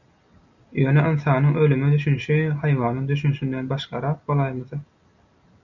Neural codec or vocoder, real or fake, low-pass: none; real; 7.2 kHz